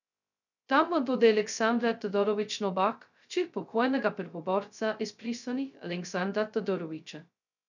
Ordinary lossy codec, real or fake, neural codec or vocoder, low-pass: none; fake; codec, 16 kHz, 0.2 kbps, FocalCodec; 7.2 kHz